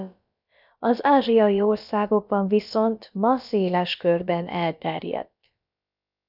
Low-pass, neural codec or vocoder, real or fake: 5.4 kHz; codec, 16 kHz, about 1 kbps, DyCAST, with the encoder's durations; fake